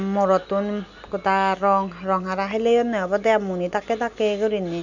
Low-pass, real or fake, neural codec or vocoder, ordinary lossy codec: 7.2 kHz; real; none; none